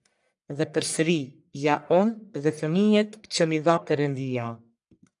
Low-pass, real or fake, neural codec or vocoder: 10.8 kHz; fake; codec, 44.1 kHz, 1.7 kbps, Pupu-Codec